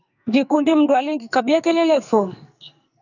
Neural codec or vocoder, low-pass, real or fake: codec, 44.1 kHz, 2.6 kbps, SNAC; 7.2 kHz; fake